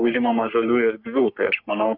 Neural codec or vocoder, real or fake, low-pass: codec, 44.1 kHz, 3.4 kbps, Pupu-Codec; fake; 5.4 kHz